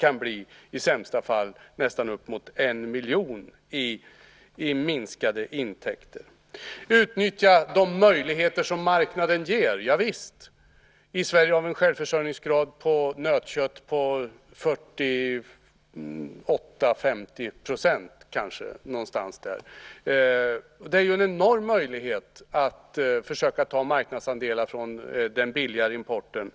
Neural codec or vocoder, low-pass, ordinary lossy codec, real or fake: none; none; none; real